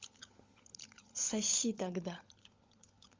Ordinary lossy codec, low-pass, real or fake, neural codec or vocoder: Opus, 32 kbps; 7.2 kHz; fake; codec, 16 kHz, 16 kbps, FunCodec, trained on LibriTTS, 50 frames a second